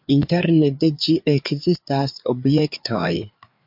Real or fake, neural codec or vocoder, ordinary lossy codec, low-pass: fake; codec, 16 kHz, 4 kbps, FreqCodec, larger model; MP3, 48 kbps; 5.4 kHz